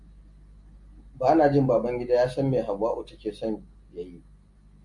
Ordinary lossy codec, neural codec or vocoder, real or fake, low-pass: MP3, 64 kbps; none; real; 10.8 kHz